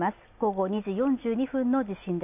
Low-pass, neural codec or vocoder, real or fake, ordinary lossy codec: 3.6 kHz; none; real; AAC, 32 kbps